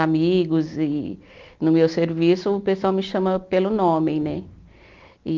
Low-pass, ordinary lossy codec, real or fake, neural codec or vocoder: 7.2 kHz; Opus, 24 kbps; real; none